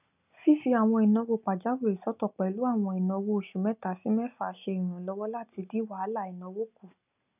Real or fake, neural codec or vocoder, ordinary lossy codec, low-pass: real; none; none; 3.6 kHz